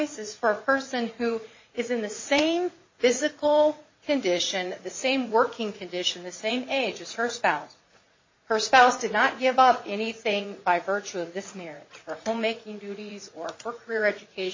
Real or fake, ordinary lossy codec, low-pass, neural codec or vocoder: fake; MP3, 32 kbps; 7.2 kHz; vocoder, 44.1 kHz, 80 mel bands, Vocos